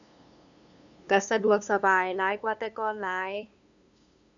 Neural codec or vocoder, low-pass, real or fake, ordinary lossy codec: codec, 16 kHz, 2 kbps, FunCodec, trained on LibriTTS, 25 frames a second; 7.2 kHz; fake; none